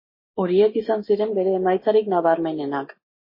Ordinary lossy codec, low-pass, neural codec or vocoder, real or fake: MP3, 24 kbps; 5.4 kHz; none; real